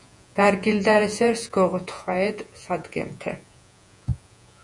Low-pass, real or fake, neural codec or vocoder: 10.8 kHz; fake; vocoder, 48 kHz, 128 mel bands, Vocos